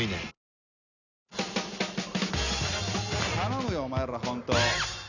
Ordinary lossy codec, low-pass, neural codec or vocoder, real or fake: none; 7.2 kHz; none; real